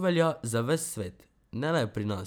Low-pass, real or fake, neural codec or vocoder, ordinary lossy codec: none; real; none; none